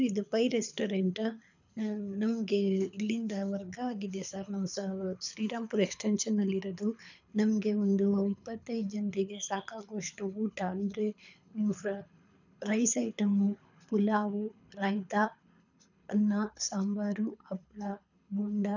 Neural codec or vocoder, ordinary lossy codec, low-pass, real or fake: codec, 24 kHz, 6 kbps, HILCodec; none; 7.2 kHz; fake